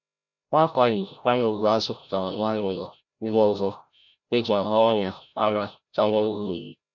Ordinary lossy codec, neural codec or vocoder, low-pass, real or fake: none; codec, 16 kHz, 0.5 kbps, FreqCodec, larger model; 7.2 kHz; fake